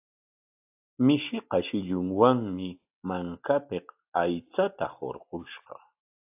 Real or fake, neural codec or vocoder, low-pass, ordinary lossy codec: real; none; 3.6 kHz; AAC, 32 kbps